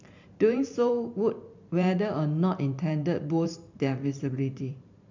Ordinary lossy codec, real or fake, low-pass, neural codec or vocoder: MP3, 64 kbps; fake; 7.2 kHz; vocoder, 44.1 kHz, 128 mel bands every 512 samples, BigVGAN v2